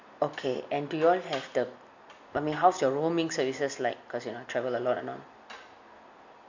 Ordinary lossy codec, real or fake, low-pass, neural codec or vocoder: MP3, 48 kbps; real; 7.2 kHz; none